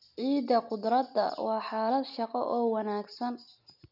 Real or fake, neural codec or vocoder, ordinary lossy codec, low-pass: real; none; none; 5.4 kHz